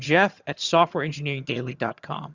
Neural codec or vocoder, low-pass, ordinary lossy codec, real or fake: vocoder, 22.05 kHz, 80 mel bands, HiFi-GAN; 7.2 kHz; Opus, 64 kbps; fake